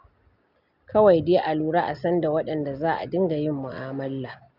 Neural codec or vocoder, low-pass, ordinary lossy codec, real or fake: none; 5.4 kHz; none; real